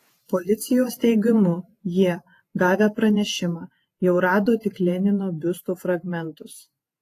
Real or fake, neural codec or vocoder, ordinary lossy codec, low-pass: fake; vocoder, 48 kHz, 128 mel bands, Vocos; AAC, 48 kbps; 14.4 kHz